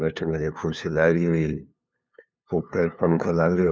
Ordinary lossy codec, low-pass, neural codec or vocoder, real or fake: none; none; codec, 16 kHz, 2 kbps, FunCodec, trained on LibriTTS, 25 frames a second; fake